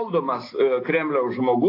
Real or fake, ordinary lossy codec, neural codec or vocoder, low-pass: real; AAC, 32 kbps; none; 5.4 kHz